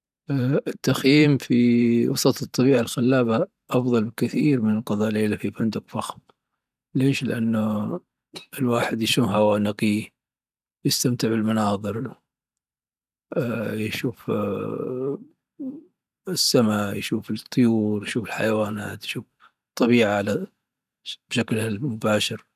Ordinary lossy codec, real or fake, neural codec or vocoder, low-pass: none; fake; vocoder, 44.1 kHz, 128 mel bands every 512 samples, BigVGAN v2; 14.4 kHz